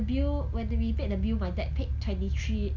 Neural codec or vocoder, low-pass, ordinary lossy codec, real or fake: none; 7.2 kHz; none; real